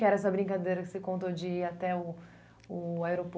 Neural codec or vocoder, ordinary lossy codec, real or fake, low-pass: none; none; real; none